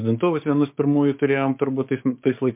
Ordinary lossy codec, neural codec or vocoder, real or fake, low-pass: MP3, 32 kbps; codec, 16 kHz, 6 kbps, DAC; fake; 3.6 kHz